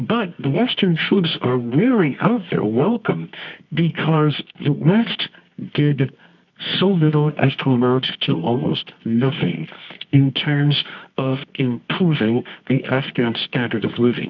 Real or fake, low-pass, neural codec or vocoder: fake; 7.2 kHz; codec, 24 kHz, 0.9 kbps, WavTokenizer, medium music audio release